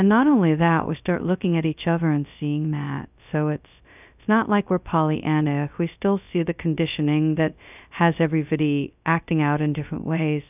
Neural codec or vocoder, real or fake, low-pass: codec, 16 kHz, 0.2 kbps, FocalCodec; fake; 3.6 kHz